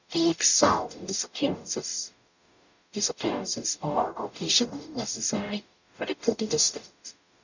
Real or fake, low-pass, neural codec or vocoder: fake; 7.2 kHz; codec, 44.1 kHz, 0.9 kbps, DAC